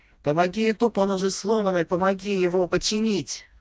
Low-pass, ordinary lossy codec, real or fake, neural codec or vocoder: none; none; fake; codec, 16 kHz, 1 kbps, FreqCodec, smaller model